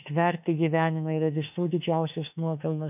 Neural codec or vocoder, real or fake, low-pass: autoencoder, 48 kHz, 32 numbers a frame, DAC-VAE, trained on Japanese speech; fake; 3.6 kHz